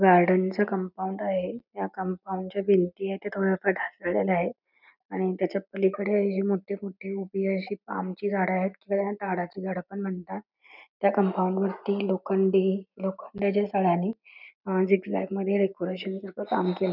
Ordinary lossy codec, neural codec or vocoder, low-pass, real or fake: none; none; 5.4 kHz; real